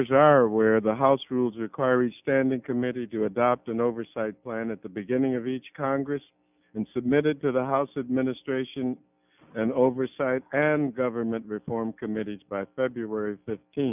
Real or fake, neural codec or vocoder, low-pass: real; none; 3.6 kHz